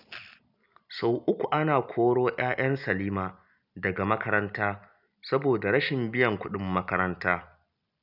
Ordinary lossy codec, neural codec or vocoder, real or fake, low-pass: none; none; real; 5.4 kHz